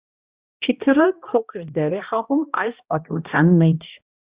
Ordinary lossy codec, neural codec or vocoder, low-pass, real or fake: Opus, 64 kbps; codec, 16 kHz, 1 kbps, X-Codec, HuBERT features, trained on balanced general audio; 3.6 kHz; fake